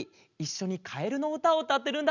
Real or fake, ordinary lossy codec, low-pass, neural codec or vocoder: real; none; 7.2 kHz; none